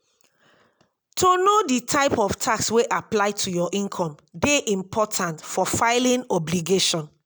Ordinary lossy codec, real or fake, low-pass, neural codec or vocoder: none; real; none; none